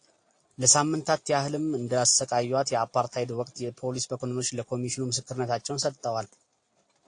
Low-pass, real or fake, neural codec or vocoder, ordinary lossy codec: 9.9 kHz; real; none; AAC, 48 kbps